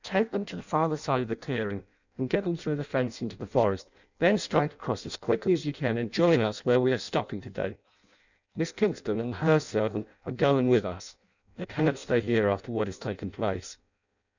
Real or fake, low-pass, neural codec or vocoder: fake; 7.2 kHz; codec, 16 kHz in and 24 kHz out, 0.6 kbps, FireRedTTS-2 codec